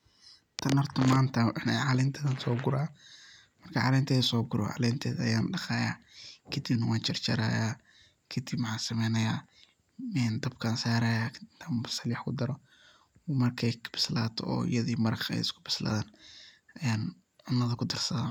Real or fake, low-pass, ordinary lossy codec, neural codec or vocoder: real; 19.8 kHz; none; none